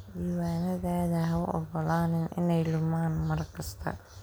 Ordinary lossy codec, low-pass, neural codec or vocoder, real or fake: none; none; none; real